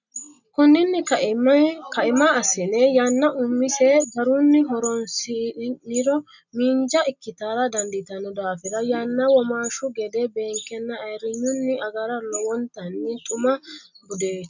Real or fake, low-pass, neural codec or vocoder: real; 7.2 kHz; none